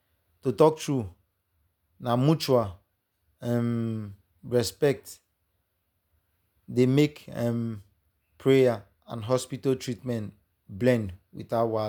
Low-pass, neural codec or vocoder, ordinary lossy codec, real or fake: none; none; none; real